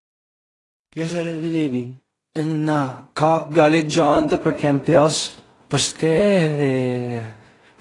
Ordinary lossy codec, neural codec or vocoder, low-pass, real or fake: AAC, 32 kbps; codec, 16 kHz in and 24 kHz out, 0.4 kbps, LongCat-Audio-Codec, two codebook decoder; 10.8 kHz; fake